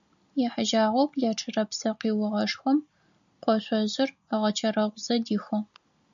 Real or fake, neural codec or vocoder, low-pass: real; none; 7.2 kHz